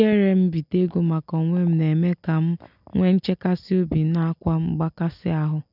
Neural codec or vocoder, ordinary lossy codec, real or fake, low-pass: none; none; real; 5.4 kHz